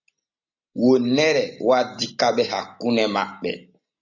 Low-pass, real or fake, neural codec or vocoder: 7.2 kHz; real; none